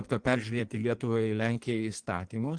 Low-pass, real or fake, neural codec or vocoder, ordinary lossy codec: 9.9 kHz; fake; codec, 16 kHz in and 24 kHz out, 1.1 kbps, FireRedTTS-2 codec; Opus, 24 kbps